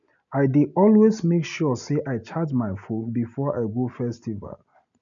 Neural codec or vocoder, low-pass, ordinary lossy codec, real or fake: none; 7.2 kHz; none; real